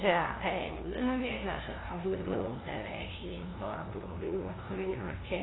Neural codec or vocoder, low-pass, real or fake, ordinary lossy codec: codec, 16 kHz, 0.5 kbps, FunCodec, trained on LibriTTS, 25 frames a second; 7.2 kHz; fake; AAC, 16 kbps